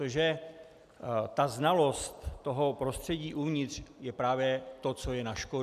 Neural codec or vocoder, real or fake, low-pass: none; real; 14.4 kHz